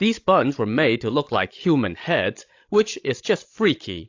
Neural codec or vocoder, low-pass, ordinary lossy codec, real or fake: codec, 16 kHz, 16 kbps, FreqCodec, larger model; 7.2 kHz; AAC, 48 kbps; fake